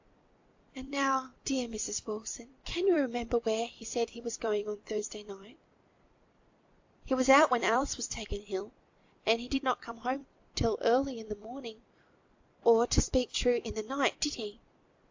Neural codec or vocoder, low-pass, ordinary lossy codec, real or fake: vocoder, 44.1 kHz, 128 mel bands every 256 samples, BigVGAN v2; 7.2 kHz; AAC, 48 kbps; fake